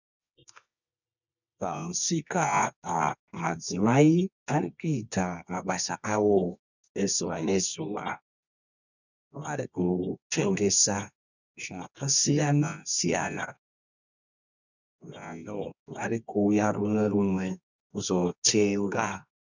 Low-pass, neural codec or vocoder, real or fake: 7.2 kHz; codec, 24 kHz, 0.9 kbps, WavTokenizer, medium music audio release; fake